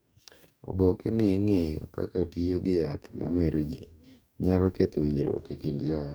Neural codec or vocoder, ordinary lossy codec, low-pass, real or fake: codec, 44.1 kHz, 2.6 kbps, DAC; none; none; fake